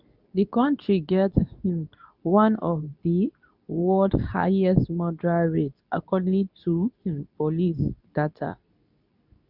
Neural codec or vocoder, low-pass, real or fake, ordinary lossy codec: codec, 24 kHz, 0.9 kbps, WavTokenizer, medium speech release version 2; 5.4 kHz; fake; none